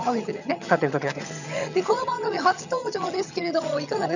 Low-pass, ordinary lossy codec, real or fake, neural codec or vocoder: 7.2 kHz; none; fake; vocoder, 22.05 kHz, 80 mel bands, HiFi-GAN